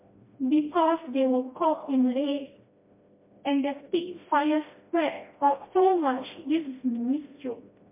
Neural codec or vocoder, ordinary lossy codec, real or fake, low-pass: codec, 16 kHz, 1 kbps, FreqCodec, smaller model; MP3, 32 kbps; fake; 3.6 kHz